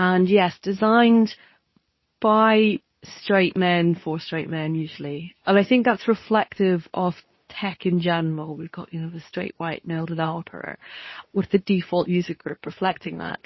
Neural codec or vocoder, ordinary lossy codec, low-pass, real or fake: codec, 24 kHz, 0.9 kbps, WavTokenizer, medium speech release version 2; MP3, 24 kbps; 7.2 kHz; fake